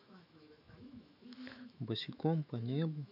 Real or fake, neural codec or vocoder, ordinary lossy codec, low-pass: fake; autoencoder, 48 kHz, 128 numbers a frame, DAC-VAE, trained on Japanese speech; none; 5.4 kHz